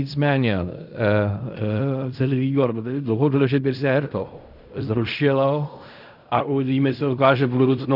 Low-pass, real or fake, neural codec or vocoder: 5.4 kHz; fake; codec, 16 kHz in and 24 kHz out, 0.4 kbps, LongCat-Audio-Codec, fine tuned four codebook decoder